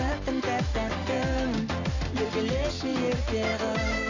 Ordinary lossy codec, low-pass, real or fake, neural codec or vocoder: AAC, 48 kbps; 7.2 kHz; real; none